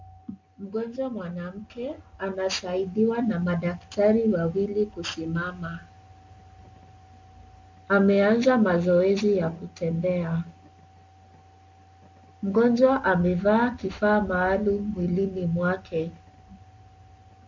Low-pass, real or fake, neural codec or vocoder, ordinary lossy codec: 7.2 kHz; real; none; MP3, 64 kbps